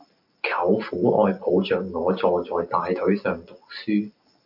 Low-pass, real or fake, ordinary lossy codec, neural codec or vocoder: 5.4 kHz; real; MP3, 48 kbps; none